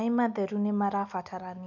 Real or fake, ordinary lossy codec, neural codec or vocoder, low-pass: real; none; none; 7.2 kHz